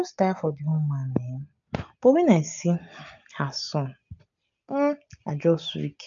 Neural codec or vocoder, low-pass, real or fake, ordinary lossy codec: none; 7.2 kHz; real; AAC, 64 kbps